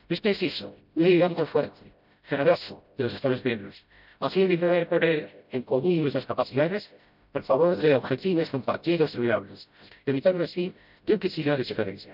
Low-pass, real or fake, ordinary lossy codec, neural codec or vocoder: 5.4 kHz; fake; none; codec, 16 kHz, 0.5 kbps, FreqCodec, smaller model